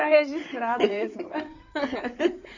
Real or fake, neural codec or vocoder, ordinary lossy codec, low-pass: fake; codec, 16 kHz in and 24 kHz out, 2.2 kbps, FireRedTTS-2 codec; none; 7.2 kHz